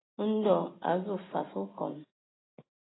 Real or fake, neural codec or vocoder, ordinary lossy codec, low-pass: real; none; AAC, 16 kbps; 7.2 kHz